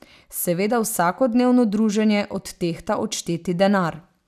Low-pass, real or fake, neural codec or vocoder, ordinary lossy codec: 14.4 kHz; real; none; none